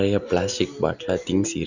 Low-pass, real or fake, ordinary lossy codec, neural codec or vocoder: 7.2 kHz; real; none; none